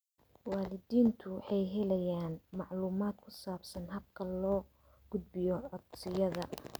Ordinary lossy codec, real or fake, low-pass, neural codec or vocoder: none; real; none; none